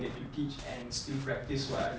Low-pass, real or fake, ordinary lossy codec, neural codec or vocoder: none; real; none; none